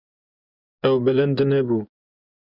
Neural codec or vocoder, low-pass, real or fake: vocoder, 24 kHz, 100 mel bands, Vocos; 5.4 kHz; fake